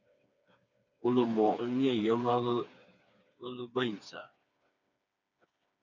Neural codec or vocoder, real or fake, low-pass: codec, 16 kHz, 4 kbps, FreqCodec, smaller model; fake; 7.2 kHz